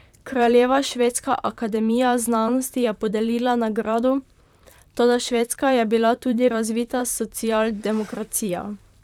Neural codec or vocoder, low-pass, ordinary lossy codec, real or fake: vocoder, 44.1 kHz, 128 mel bands, Pupu-Vocoder; 19.8 kHz; none; fake